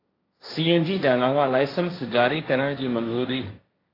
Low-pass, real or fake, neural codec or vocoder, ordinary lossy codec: 5.4 kHz; fake; codec, 16 kHz, 1.1 kbps, Voila-Tokenizer; AAC, 24 kbps